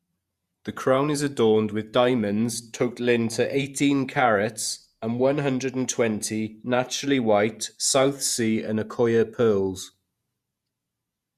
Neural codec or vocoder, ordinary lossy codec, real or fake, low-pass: vocoder, 48 kHz, 128 mel bands, Vocos; Opus, 64 kbps; fake; 14.4 kHz